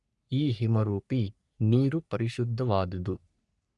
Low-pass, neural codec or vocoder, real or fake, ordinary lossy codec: 10.8 kHz; codec, 44.1 kHz, 3.4 kbps, Pupu-Codec; fake; none